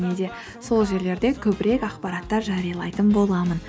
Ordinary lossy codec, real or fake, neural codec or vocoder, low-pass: none; real; none; none